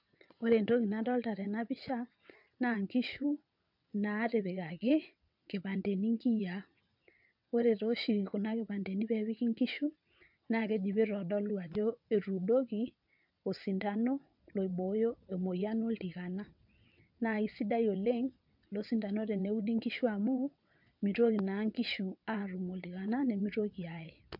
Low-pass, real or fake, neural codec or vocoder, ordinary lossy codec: 5.4 kHz; fake; vocoder, 22.05 kHz, 80 mel bands, WaveNeXt; MP3, 48 kbps